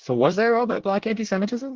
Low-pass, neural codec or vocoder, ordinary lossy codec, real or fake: 7.2 kHz; codec, 24 kHz, 1 kbps, SNAC; Opus, 16 kbps; fake